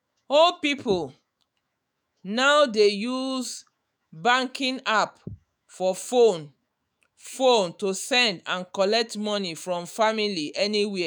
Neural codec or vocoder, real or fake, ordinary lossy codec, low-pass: autoencoder, 48 kHz, 128 numbers a frame, DAC-VAE, trained on Japanese speech; fake; none; none